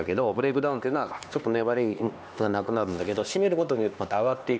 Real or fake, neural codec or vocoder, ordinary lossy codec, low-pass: fake; codec, 16 kHz, 2 kbps, X-Codec, HuBERT features, trained on LibriSpeech; none; none